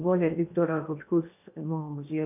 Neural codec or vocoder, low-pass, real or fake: codec, 16 kHz in and 24 kHz out, 0.8 kbps, FocalCodec, streaming, 65536 codes; 3.6 kHz; fake